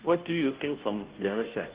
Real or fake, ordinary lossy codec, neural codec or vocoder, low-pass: fake; Opus, 16 kbps; codec, 16 kHz, 0.5 kbps, FunCodec, trained on Chinese and English, 25 frames a second; 3.6 kHz